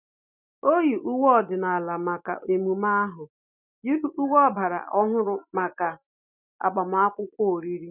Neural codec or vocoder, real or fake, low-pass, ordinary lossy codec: none; real; 3.6 kHz; none